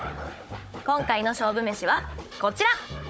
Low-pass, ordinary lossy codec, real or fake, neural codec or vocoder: none; none; fake; codec, 16 kHz, 16 kbps, FunCodec, trained on Chinese and English, 50 frames a second